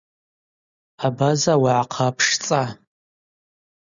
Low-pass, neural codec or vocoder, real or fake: 7.2 kHz; none; real